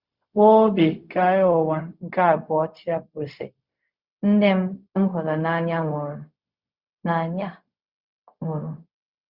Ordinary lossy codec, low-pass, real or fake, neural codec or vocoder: Opus, 64 kbps; 5.4 kHz; fake; codec, 16 kHz, 0.4 kbps, LongCat-Audio-Codec